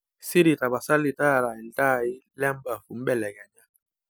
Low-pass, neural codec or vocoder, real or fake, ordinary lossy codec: none; none; real; none